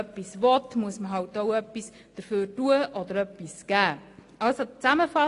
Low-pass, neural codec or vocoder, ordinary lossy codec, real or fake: 10.8 kHz; none; AAC, 48 kbps; real